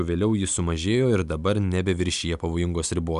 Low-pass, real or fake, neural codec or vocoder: 10.8 kHz; real; none